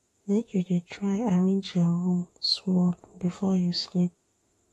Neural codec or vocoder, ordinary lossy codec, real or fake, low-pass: autoencoder, 48 kHz, 32 numbers a frame, DAC-VAE, trained on Japanese speech; AAC, 32 kbps; fake; 19.8 kHz